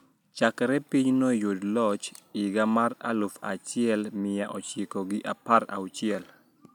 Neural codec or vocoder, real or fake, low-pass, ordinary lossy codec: none; real; 19.8 kHz; none